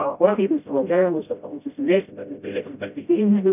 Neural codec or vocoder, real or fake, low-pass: codec, 16 kHz, 0.5 kbps, FreqCodec, smaller model; fake; 3.6 kHz